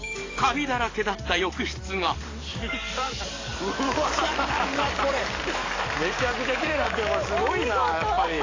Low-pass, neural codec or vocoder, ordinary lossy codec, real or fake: 7.2 kHz; codec, 44.1 kHz, 7.8 kbps, DAC; AAC, 32 kbps; fake